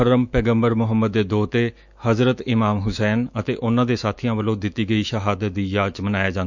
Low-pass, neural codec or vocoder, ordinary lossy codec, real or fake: 7.2 kHz; autoencoder, 48 kHz, 128 numbers a frame, DAC-VAE, trained on Japanese speech; none; fake